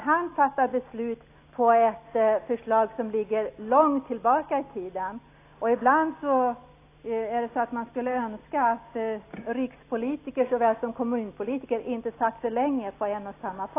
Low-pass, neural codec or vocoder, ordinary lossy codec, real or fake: 3.6 kHz; none; AAC, 24 kbps; real